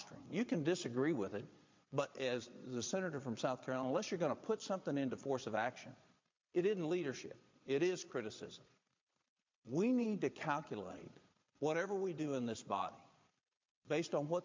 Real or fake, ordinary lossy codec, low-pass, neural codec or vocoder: fake; MP3, 64 kbps; 7.2 kHz; vocoder, 22.05 kHz, 80 mel bands, Vocos